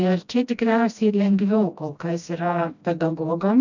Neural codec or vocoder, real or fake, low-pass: codec, 16 kHz, 1 kbps, FreqCodec, smaller model; fake; 7.2 kHz